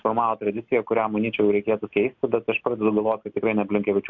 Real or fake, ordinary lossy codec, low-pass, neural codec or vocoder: real; MP3, 64 kbps; 7.2 kHz; none